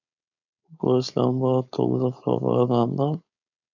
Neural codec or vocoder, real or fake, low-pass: codec, 16 kHz, 4.8 kbps, FACodec; fake; 7.2 kHz